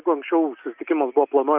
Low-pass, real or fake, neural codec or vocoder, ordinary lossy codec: 3.6 kHz; real; none; Opus, 24 kbps